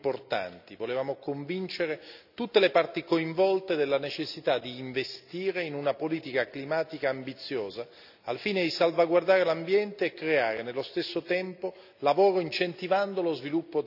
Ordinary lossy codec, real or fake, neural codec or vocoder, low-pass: none; real; none; 5.4 kHz